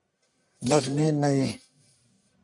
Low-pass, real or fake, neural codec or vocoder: 10.8 kHz; fake; codec, 44.1 kHz, 1.7 kbps, Pupu-Codec